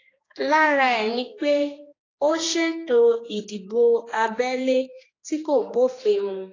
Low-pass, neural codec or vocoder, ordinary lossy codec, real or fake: 7.2 kHz; codec, 16 kHz, 2 kbps, X-Codec, HuBERT features, trained on general audio; AAC, 32 kbps; fake